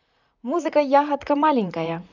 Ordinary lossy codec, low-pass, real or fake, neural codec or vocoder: none; 7.2 kHz; fake; vocoder, 44.1 kHz, 128 mel bands, Pupu-Vocoder